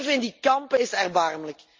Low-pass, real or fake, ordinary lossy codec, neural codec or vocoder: 7.2 kHz; real; Opus, 32 kbps; none